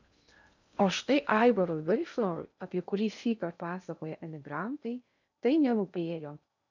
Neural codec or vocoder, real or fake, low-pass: codec, 16 kHz in and 24 kHz out, 0.6 kbps, FocalCodec, streaming, 2048 codes; fake; 7.2 kHz